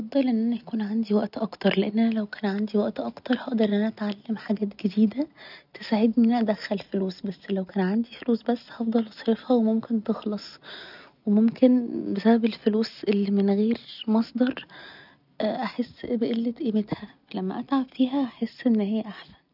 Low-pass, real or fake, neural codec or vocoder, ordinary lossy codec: 5.4 kHz; real; none; none